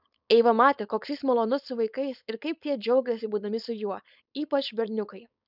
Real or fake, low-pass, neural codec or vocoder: fake; 5.4 kHz; codec, 16 kHz, 4.8 kbps, FACodec